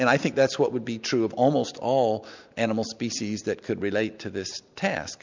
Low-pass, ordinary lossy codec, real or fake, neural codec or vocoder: 7.2 kHz; MP3, 64 kbps; real; none